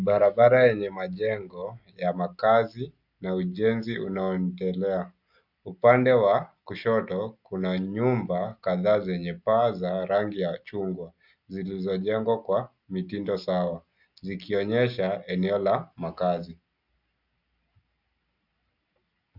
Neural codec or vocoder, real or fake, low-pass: none; real; 5.4 kHz